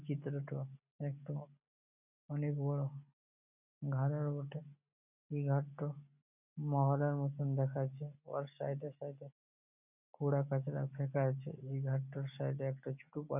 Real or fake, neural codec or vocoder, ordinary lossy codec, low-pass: real; none; none; 3.6 kHz